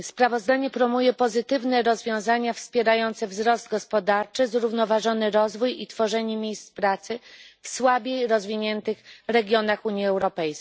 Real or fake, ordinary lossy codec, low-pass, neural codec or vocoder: real; none; none; none